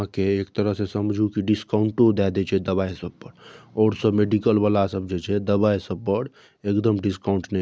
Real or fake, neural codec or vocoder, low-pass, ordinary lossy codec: real; none; none; none